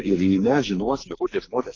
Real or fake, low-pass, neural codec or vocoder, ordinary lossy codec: fake; 7.2 kHz; codec, 44.1 kHz, 2.6 kbps, SNAC; AAC, 32 kbps